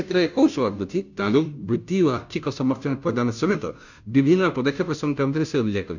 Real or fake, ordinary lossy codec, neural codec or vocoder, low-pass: fake; none; codec, 16 kHz, 0.5 kbps, FunCodec, trained on Chinese and English, 25 frames a second; 7.2 kHz